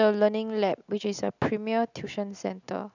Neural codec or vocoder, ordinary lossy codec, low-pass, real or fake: none; none; 7.2 kHz; real